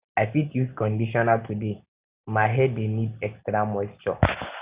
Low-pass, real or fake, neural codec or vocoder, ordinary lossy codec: 3.6 kHz; real; none; Opus, 64 kbps